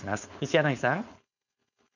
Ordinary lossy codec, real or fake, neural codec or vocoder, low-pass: none; fake; codec, 16 kHz, 4.8 kbps, FACodec; 7.2 kHz